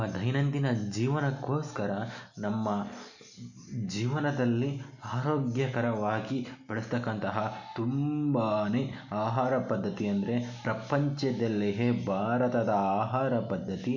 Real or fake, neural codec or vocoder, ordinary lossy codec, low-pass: real; none; none; 7.2 kHz